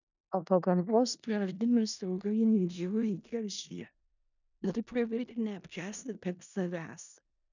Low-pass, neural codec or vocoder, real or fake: 7.2 kHz; codec, 16 kHz in and 24 kHz out, 0.4 kbps, LongCat-Audio-Codec, four codebook decoder; fake